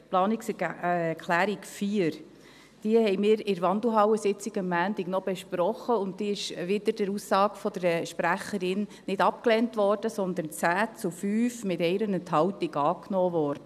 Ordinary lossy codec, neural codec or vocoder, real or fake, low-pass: none; none; real; 14.4 kHz